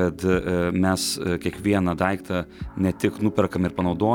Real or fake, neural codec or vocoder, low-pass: real; none; 19.8 kHz